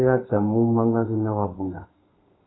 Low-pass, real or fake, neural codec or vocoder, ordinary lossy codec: 7.2 kHz; fake; codec, 32 kHz, 1.9 kbps, SNAC; AAC, 16 kbps